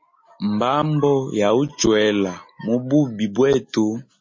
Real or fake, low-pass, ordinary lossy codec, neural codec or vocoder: real; 7.2 kHz; MP3, 32 kbps; none